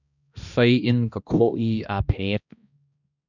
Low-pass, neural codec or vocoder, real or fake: 7.2 kHz; codec, 16 kHz, 1 kbps, X-Codec, HuBERT features, trained on balanced general audio; fake